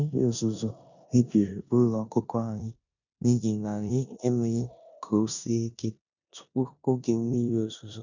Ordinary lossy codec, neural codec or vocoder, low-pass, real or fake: none; codec, 16 kHz in and 24 kHz out, 0.9 kbps, LongCat-Audio-Codec, four codebook decoder; 7.2 kHz; fake